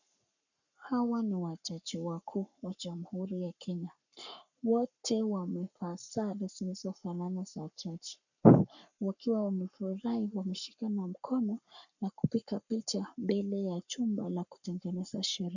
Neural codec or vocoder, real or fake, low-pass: codec, 44.1 kHz, 7.8 kbps, Pupu-Codec; fake; 7.2 kHz